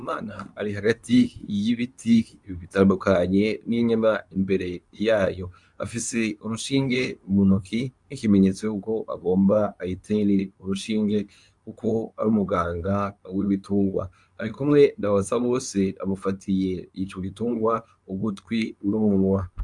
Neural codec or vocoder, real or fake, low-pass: codec, 24 kHz, 0.9 kbps, WavTokenizer, medium speech release version 2; fake; 10.8 kHz